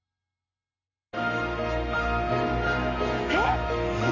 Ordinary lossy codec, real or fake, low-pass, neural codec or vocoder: none; real; 7.2 kHz; none